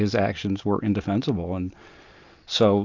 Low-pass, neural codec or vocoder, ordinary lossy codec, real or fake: 7.2 kHz; none; MP3, 64 kbps; real